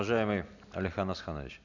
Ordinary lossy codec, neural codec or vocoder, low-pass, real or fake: none; none; 7.2 kHz; real